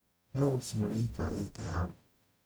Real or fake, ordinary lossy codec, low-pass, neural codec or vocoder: fake; none; none; codec, 44.1 kHz, 0.9 kbps, DAC